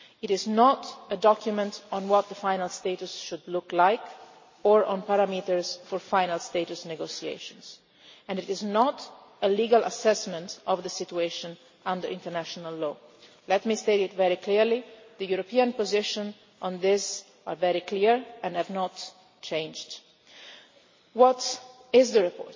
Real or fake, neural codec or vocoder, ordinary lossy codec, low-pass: real; none; MP3, 32 kbps; 7.2 kHz